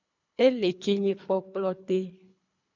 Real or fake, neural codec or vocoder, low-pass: fake; codec, 24 kHz, 3 kbps, HILCodec; 7.2 kHz